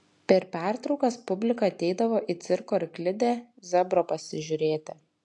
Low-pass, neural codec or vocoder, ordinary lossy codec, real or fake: 10.8 kHz; none; AAC, 64 kbps; real